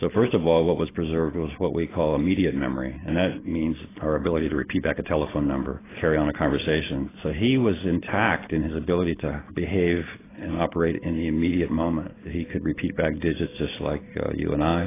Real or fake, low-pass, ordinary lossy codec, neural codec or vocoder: real; 3.6 kHz; AAC, 16 kbps; none